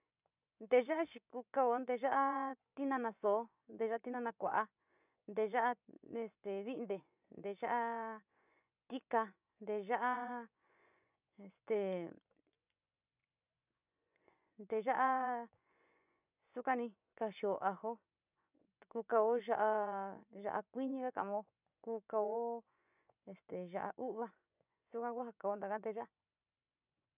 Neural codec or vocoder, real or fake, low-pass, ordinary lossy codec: vocoder, 24 kHz, 100 mel bands, Vocos; fake; 3.6 kHz; none